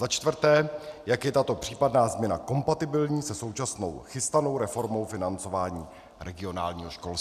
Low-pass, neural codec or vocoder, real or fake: 14.4 kHz; none; real